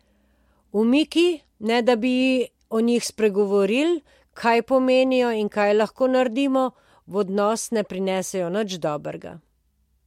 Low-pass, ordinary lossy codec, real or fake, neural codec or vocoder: 19.8 kHz; MP3, 64 kbps; real; none